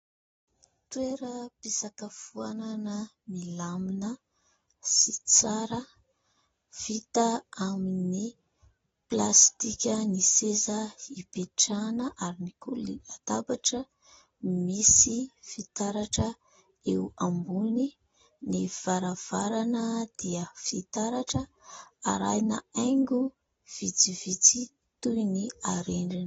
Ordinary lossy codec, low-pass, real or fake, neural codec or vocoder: AAC, 24 kbps; 19.8 kHz; real; none